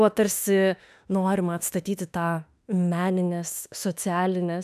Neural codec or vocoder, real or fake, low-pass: autoencoder, 48 kHz, 32 numbers a frame, DAC-VAE, trained on Japanese speech; fake; 14.4 kHz